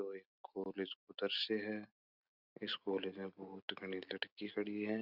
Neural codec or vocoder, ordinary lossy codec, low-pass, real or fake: none; Opus, 64 kbps; 5.4 kHz; real